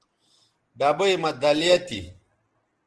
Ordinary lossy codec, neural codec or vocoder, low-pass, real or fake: Opus, 16 kbps; none; 9.9 kHz; real